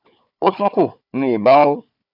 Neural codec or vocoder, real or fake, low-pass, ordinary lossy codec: codec, 16 kHz, 4 kbps, FunCodec, trained on Chinese and English, 50 frames a second; fake; 5.4 kHz; none